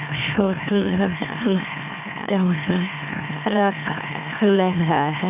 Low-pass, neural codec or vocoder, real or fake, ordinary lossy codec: 3.6 kHz; autoencoder, 44.1 kHz, a latent of 192 numbers a frame, MeloTTS; fake; none